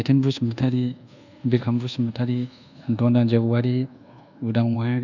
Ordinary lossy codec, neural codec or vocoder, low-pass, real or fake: none; codec, 24 kHz, 1.2 kbps, DualCodec; 7.2 kHz; fake